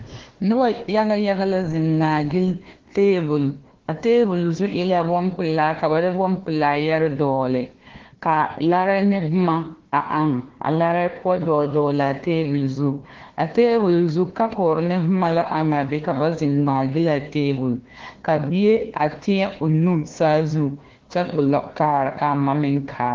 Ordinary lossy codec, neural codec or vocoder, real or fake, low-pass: Opus, 16 kbps; codec, 16 kHz, 1 kbps, FunCodec, trained on Chinese and English, 50 frames a second; fake; 7.2 kHz